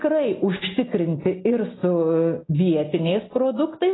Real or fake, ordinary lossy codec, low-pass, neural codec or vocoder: real; AAC, 16 kbps; 7.2 kHz; none